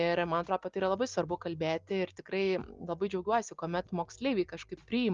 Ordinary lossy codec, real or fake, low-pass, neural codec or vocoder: Opus, 32 kbps; real; 7.2 kHz; none